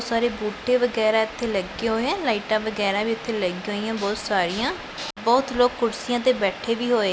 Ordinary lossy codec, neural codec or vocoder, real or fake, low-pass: none; none; real; none